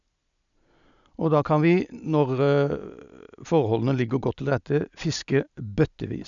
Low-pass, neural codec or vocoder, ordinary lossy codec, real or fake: 7.2 kHz; none; none; real